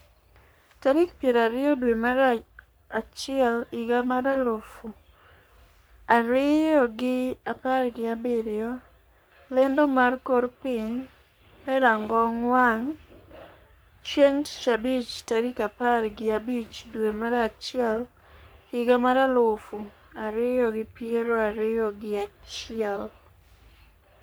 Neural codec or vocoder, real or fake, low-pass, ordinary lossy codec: codec, 44.1 kHz, 3.4 kbps, Pupu-Codec; fake; none; none